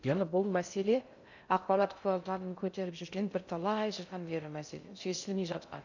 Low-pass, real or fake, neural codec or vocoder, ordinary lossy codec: 7.2 kHz; fake; codec, 16 kHz in and 24 kHz out, 0.6 kbps, FocalCodec, streaming, 2048 codes; none